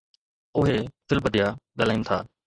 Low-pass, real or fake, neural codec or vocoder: 9.9 kHz; real; none